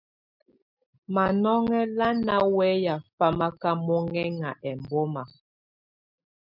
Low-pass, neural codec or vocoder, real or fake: 5.4 kHz; none; real